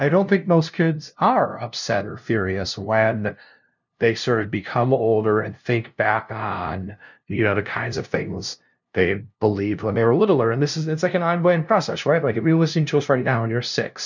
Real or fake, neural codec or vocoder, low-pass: fake; codec, 16 kHz, 0.5 kbps, FunCodec, trained on LibriTTS, 25 frames a second; 7.2 kHz